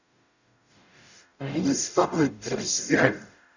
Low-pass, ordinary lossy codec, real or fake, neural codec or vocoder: 7.2 kHz; none; fake; codec, 44.1 kHz, 0.9 kbps, DAC